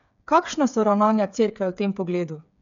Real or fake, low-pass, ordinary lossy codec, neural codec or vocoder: fake; 7.2 kHz; none; codec, 16 kHz, 8 kbps, FreqCodec, smaller model